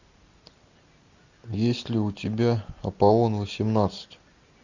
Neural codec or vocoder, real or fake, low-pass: none; real; 7.2 kHz